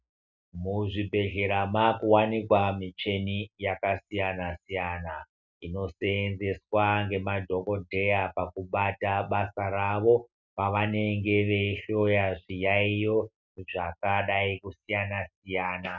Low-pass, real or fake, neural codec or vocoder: 7.2 kHz; real; none